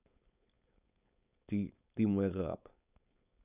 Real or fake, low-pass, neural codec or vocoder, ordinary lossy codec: fake; 3.6 kHz; codec, 16 kHz, 4.8 kbps, FACodec; none